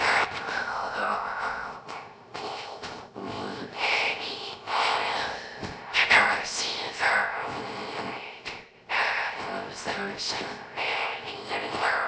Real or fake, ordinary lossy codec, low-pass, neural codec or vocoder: fake; none; none; codec, 16 kHz, 0.3 kbps, FocalCodec